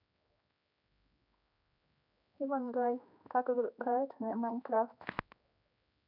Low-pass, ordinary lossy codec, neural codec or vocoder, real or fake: 5.4 kHz; none; codec, 16 kHz, 2 kbps, X-Codec, HuBERT features, trained on general audio; fake